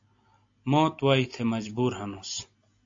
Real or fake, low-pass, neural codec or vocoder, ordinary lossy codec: real; 7.2 kHz; none; AAC, 48 kbps